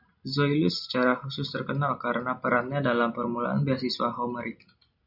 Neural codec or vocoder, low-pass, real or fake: none; 5.4 kHz; real